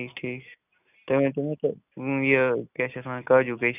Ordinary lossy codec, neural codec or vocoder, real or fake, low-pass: none; none; real; 3.6 kHz